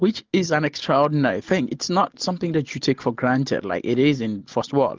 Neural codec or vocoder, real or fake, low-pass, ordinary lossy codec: none; real; 7.2 kHz; Opus, 24 kbps